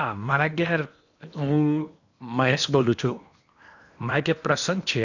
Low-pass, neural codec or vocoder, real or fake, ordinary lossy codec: 7.2 kHz; codec, 16 kHz in and 24 kHz out, 0.8 kbps, FocalCodec, streaming, 65536 codes; fake; none